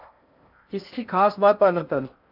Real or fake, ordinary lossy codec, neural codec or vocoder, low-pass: fake; AAC, 48 kbps; codec, 16 kHz in and 24 kHz out, 0.6 kbps, FocalCodec, streaming, 4096 codes; 5.4 kHz